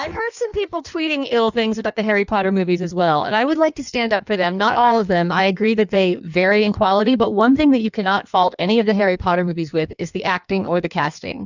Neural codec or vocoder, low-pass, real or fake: codec, 16 kHz in and 24 kHz out, 1.1 kbps, FireRedTTS-2 codec; 7.2 kHz; fake